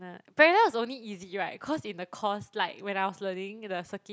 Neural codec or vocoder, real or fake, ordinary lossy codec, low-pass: none; real; none; none